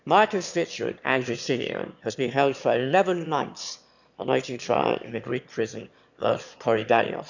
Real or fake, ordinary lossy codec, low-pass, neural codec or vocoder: fake; none; 7.2 kHz; autoencoder, 22.05 kHz, a latent of 192 numbers a frame, VITS, trained on one speaker